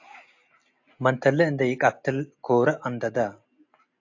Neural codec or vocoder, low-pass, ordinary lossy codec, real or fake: none; 7.2 kHz; AAC, 48 kbps; real